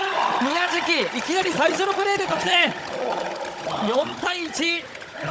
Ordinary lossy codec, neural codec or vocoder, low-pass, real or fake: none; codec, 16 kHz, 16 kbps, FunCodec, trained on Chinese and English, 50 frames a second; none; fake